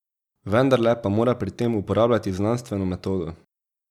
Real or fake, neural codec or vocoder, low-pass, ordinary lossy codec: real; none; 19.8 kHz; none